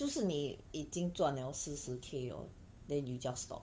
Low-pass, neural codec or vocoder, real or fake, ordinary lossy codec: none; codec, 16 kHz, 8 kbps, FunCodec, trained on Chinese and English, 25 frames a second; fake; none